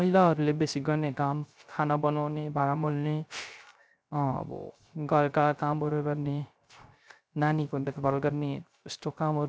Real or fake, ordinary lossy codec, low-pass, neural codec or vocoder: fake; none; none; codec, 16 kHz, 0.3 kbps, FocalCodec